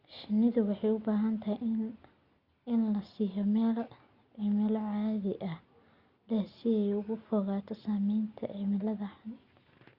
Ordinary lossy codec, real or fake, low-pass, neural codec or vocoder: Opus, 64 kbps; real; 5.4 kHz; none